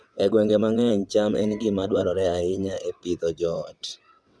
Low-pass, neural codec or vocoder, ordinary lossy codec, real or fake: none; vocoder, 22.05 kHz, 80 mel bands, WaveNeXt; none; fake